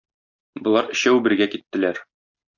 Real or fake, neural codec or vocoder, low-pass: real; none; 7.2 kHz